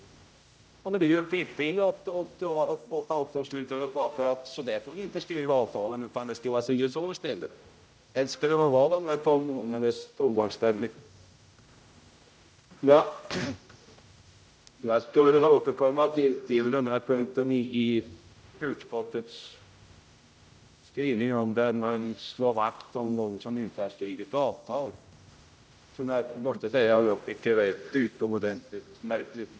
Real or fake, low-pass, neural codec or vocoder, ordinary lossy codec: fake; none; codec, 16 kHz, 0.5 kbps, X-Codec, HuBERT features, trained on general audio; none